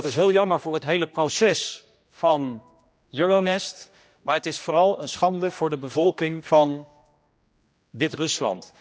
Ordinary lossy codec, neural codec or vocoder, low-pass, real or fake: none; codec, 16 kHz, 1 kbps, X-Codec, HuBERT features, trained on general audio; none; fake